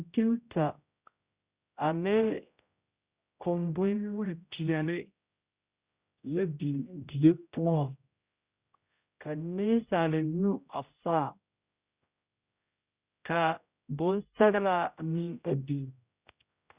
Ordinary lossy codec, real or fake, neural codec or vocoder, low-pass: Opus, 64 kbps; fake; codec, 16 kHz, 0.5 kbps, X-Codec, HuBERT features, trained on general audio; 3.6 kHz